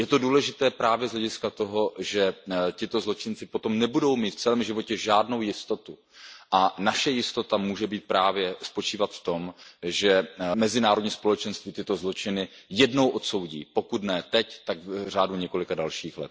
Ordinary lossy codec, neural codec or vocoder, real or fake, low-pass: none; none; real; none